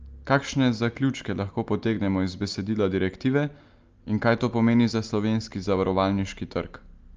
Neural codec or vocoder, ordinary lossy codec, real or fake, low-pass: none; Opus, 24 kbps; real; 7.2 kHz